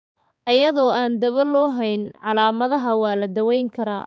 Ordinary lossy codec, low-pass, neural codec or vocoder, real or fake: none; none; codec, 16 kHz, 4 kbps, X-Codec, HuBERT features, trained on balanced general audio; fake